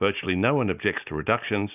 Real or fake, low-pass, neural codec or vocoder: real; 3.6 kHz; none